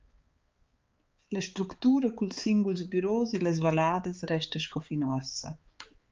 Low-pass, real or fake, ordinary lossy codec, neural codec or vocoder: 7.2 kHz; fake; Opus, 24 kbps; codec, 16 kHz, 4 kbps, X-Codec, HuBERT features, trained on balanced general audio